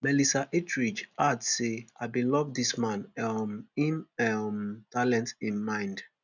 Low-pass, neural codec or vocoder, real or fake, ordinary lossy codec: 7.2 kHz; none; real; none